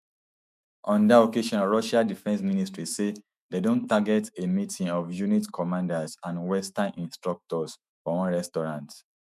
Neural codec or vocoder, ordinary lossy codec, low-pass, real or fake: autoencoder, 48 kHz, 128 numbers a frame, DAC-VAE, trained on Japanese speech; none; 14.4 kHz; fake